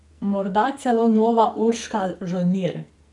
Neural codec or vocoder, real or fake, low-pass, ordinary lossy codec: codec, 44.1 kHz, 2.6 kbps, SNAC; fake; 10.8 kHz; none